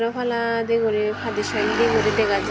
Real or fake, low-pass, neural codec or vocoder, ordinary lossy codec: real; none; none; none